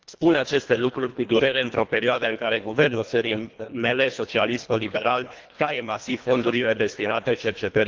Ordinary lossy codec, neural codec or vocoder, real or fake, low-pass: Opus, 24 kbps; codec, 24 kHz, 1.5 kbps, HILCodec; fake; 7.2 kHz